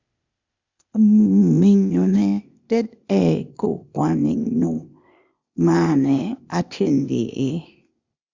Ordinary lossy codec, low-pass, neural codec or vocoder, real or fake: Opus, 64 kbps; 7.2 kHz; codec, 16 kHz, 0.8 kbps, ZipCodec; fake